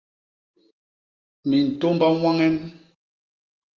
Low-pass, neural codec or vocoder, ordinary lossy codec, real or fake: 7.2 kHz; none; Opus, 32 kbps; real